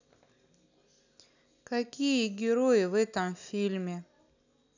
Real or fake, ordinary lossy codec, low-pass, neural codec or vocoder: real; none; 7.2 kHz; none